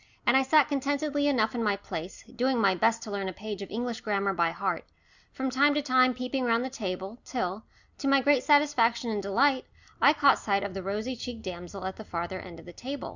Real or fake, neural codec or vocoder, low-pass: real; none; 7.2 kHz